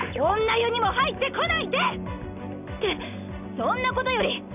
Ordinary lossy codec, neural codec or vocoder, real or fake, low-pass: none; none; real; 3.6 kHz